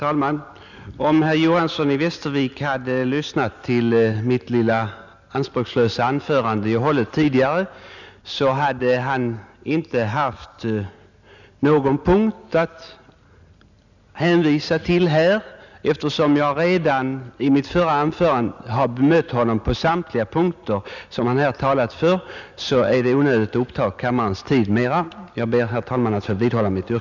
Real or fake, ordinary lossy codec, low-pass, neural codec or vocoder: real; none; 7.2 kHz; none